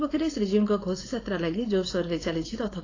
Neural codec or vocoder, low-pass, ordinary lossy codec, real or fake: codec, 16 kHz, 4.8 kbps, FACodec; 7.2 kHz; AAC, 32 kbps; fake